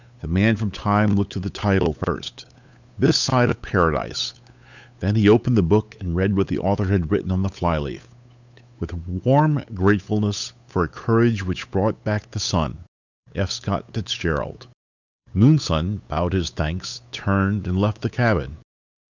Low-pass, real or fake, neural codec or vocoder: 7.2 kHz; fake; codec, 16 kHz, 8 kbps, FunCodec, trained on Chinese and English, 25 frames a second